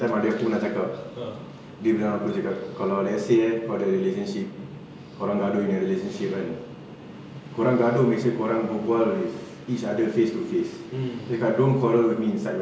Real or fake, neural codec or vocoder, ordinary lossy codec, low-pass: real; none; none; none